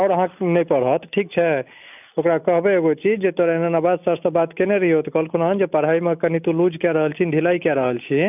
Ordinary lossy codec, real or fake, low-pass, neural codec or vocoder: none; real; 3.6 kHz; none